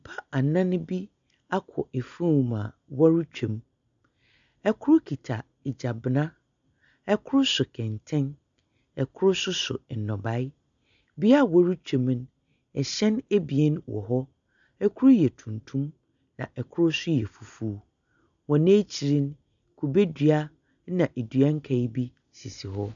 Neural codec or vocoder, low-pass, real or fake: none; 7.2 kHz; real